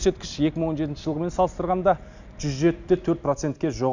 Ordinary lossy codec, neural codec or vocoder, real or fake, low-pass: none; none; real; 7.2 kHz